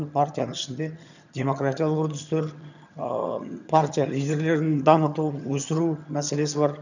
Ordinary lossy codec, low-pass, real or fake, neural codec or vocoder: none; 7.2 kHz; fake; vocoder, 22.05 kHz, 80 mel bands, HiFi-GAN